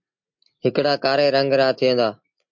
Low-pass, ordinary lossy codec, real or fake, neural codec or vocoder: 7.2 kHz; MP3, 48 kbps; real; none